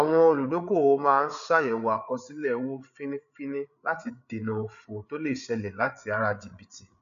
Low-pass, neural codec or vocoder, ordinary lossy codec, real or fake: 7.2 kHz; codec, 16 kHz, 8 kbps, FreqCodec, larger model; none; fake